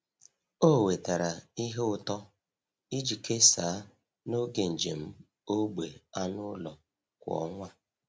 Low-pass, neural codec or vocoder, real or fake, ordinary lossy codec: none; none; real; none